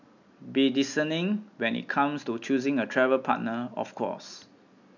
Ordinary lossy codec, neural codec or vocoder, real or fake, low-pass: none; none; real; 7.2 kHz